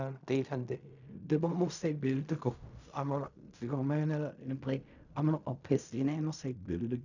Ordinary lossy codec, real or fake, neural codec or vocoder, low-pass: none; fake; codec, 16 kHz in and 24 kHz out, 0.4 kbps, LongCat-Audio-Codec, fine tuned four codebook decoder; 7.2 kHz